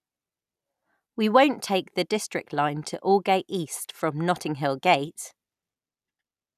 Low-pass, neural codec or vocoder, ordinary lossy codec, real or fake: 14.4 kHz; none; none; real